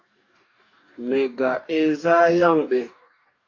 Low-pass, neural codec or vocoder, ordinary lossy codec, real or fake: 7.2 kHz; codec, 44.1 kHz, 2.6 kbps, DAC; AAC, 48 kbps; fake